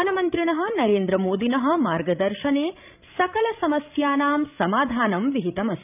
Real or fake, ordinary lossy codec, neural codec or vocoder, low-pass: fake; none; codec, 16 kHz, 16 kbps, FreqCodec, larger model; 3.6 kHz